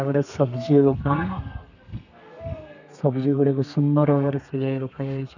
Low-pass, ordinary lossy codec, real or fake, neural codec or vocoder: 7.2 kHz; none; fake; codec, 32 kHz, 1.9 kbps, SNAC